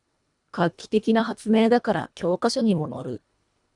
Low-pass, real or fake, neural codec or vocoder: 10.8 kHz; fake; codec, 24 kHz, 1.5 kbps, HILCodec